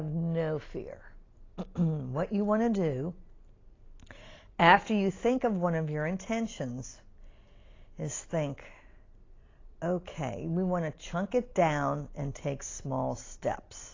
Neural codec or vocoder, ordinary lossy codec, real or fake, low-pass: none; AAC, 32 kbps; real; 7.2 kHz